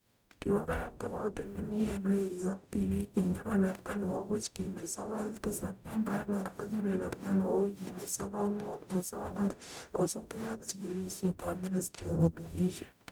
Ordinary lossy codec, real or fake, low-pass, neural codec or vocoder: none; fake; none; codec, 44.1 kHz, 0.9 kbps, DAC